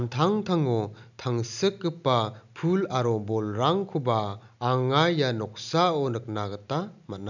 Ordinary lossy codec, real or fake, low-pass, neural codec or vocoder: none; real; 7.2 kHz; none